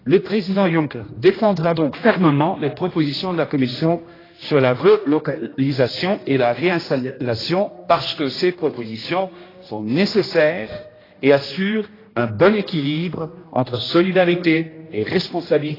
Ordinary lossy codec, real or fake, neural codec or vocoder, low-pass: AAC, 24 kbps; fake; codec, 16 kHz, 1 kbps, X-Codec, HuBERT features, trained on general audio; 5.4 kHz